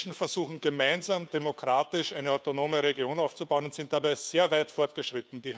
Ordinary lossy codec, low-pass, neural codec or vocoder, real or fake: none; none; codec, 16 kHz, 2 kbps, FunCodec, trained on Chinese and English, 25 frames a second; fake